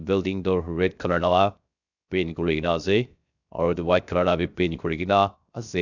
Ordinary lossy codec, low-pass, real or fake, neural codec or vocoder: none; 7.2 kHz; fake; codec, 16 kHz, 0.7 kbps, FocalCodec